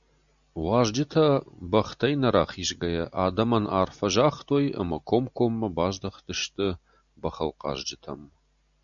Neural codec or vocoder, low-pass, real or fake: none; 7.2 kHz; real